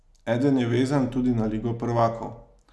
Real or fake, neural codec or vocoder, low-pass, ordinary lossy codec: real; none; none; none